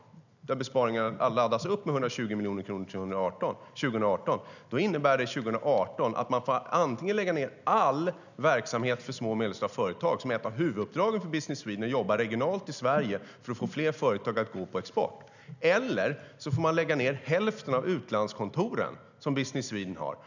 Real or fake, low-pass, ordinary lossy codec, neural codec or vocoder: real; 7.2 kHz; none; none